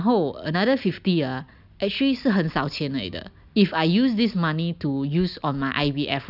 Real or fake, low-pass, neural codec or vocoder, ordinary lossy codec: real; 5.4 kHz; none; none